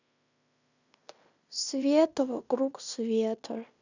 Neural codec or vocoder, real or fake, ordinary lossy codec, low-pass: codec, 16 kHz in and 24 kHz out, 0.9 kbps, LongCat-Audio-Codec, fine tuned four codebook decoder; fake; none; 7.2 kHz